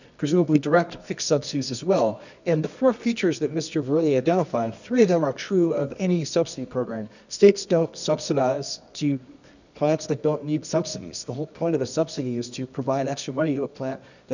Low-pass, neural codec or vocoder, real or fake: 7.2 kHz; codec, 24 kHz, 0.9 kbps, WavTokenizer, medium music audio release; fake